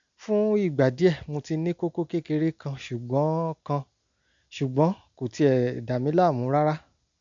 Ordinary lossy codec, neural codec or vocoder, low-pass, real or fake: MP3, 64 kbps; none; 7.2 kHz; real